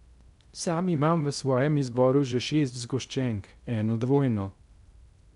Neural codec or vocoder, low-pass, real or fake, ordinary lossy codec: codec, 16 kHz in and 24 kHz out, 0.6 kbps, FocalCodec, streaming, 2048 codes; 10.8 kHz; fake; MP3, 96 kbps